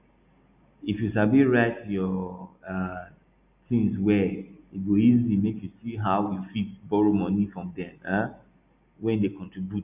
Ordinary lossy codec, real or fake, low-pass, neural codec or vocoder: none; real; 3.6 kHz; none